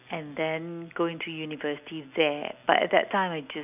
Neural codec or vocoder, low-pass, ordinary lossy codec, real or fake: none; 3.6 kHz; none; real